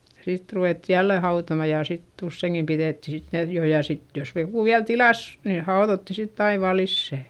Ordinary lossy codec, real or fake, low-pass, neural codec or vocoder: Opus, 32 kbps; real; 19.8 kHz; none